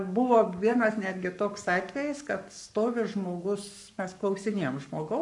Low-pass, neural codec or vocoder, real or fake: 10.8 kHz; codec, 44.1 kHz, 7.8 kbps, Pupu-Codec; fake